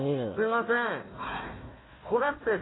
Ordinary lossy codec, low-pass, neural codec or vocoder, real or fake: AAC, 16 kbps; 7.2 kHz; codec, 16 kHz, 1 kbps, FunCodec, trained on Chinese and English, 50 frames a second; fake